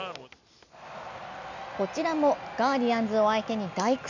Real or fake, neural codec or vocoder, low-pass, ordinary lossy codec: real; none; 7.2 kHz; none